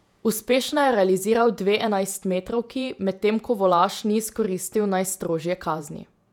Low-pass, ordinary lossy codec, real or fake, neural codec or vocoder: 19.8 kHz; none; fake; vocoder, 48 kHz, 128 mel bands, Vocos